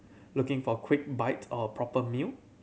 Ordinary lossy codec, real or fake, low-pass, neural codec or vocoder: none; real; none; none